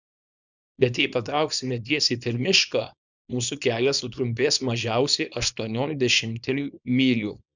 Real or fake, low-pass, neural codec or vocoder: fake; 7.2 kHz; codec, 24 kHz, 0.9 kbps, WavTokenizer, small release